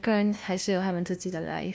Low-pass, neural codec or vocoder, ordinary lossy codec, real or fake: none; codec, 16 kHz, 1 kbps, FunCodec, trained on LibriTTS, 50 frames a second; none; fake